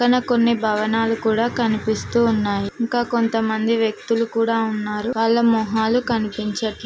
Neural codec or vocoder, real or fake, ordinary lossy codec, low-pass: none; real; none; none